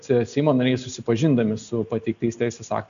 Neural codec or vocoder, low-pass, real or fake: none; 7.2 kHz; real